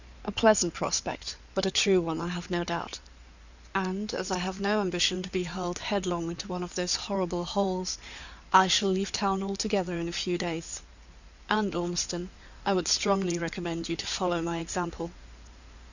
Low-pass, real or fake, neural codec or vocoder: 7.2 kHz; fake; codec, 16 kHz in and 24 kHz out, 2.2 kbps, FireRedTTS-2 codec